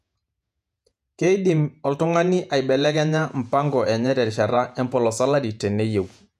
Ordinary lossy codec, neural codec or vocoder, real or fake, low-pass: none; vocoder, 48 kHz, 128 mel bands, Vocos; fake; 14.4 kHz